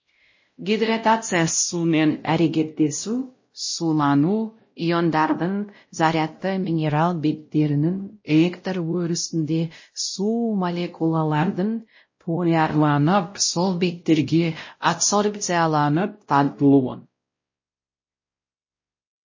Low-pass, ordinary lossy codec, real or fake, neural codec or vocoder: 7.2 kHz; MP3, 32 kbps; fake; codec, 16 kHz, 0.5 kbps, X-Codec, WavLM features, trained on Multilingual LibriSpeech